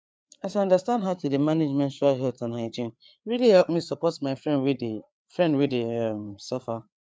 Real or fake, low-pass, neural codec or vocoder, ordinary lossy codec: fake; none; codec, 16 kHz, 4 kbps, FreqCodec, larger model; none